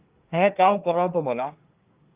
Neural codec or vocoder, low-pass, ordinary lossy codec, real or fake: codec, 24 kHz, 1 kbps, SNAC; 3.6 kHz; Opus, 24 kbps; fake